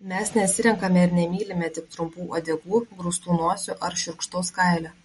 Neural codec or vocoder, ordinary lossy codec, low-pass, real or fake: none; MP3, 48 kbps; 19.8 kHz; real